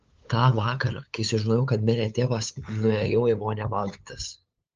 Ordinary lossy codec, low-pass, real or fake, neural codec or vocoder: Opus, 24 kbps; 7.2 kHz; fake; codec, 16 kHz, 8 kbps, FunCodec, trained on LibriTTS, 25 frames a second